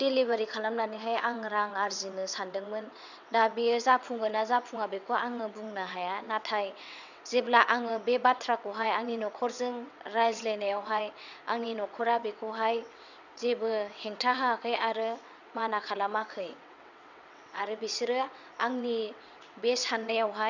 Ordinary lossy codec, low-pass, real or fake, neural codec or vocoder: none; 7.2 kHz; fake; vocoder, 22.05 kHz, 80 mel bands, WaveNeXt